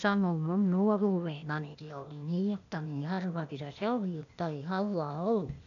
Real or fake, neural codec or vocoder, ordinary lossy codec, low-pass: fake; codec, 16 kHz, 0.8 kbps, ZipCodec; none; 7.2 kHz